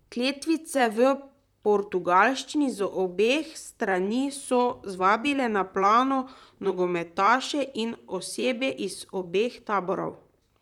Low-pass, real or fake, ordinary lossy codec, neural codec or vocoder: 19.8 kHz; fake; none; vocoder, 44.1 kHz, 128 mel bands, Pupu-Vocoder